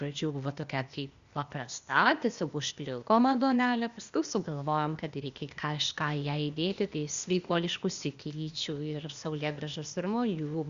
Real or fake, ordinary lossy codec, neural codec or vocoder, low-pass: fake; Opus, 64 kbps; codec, 16 kHz, 0.8 kbps, ZipCodec; 7.2 kHz